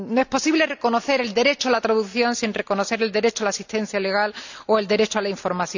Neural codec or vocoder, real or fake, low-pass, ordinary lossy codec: none; real; 7.2 kHz; none